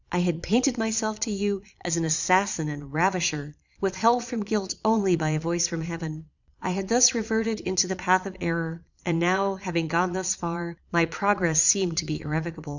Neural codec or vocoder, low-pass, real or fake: vocoder, 22.05 kHz, 80 mel bands, Vocos; 7.2 kHz; fake